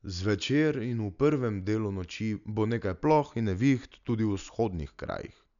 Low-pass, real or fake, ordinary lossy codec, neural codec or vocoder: 7.2 kHz; real; none; none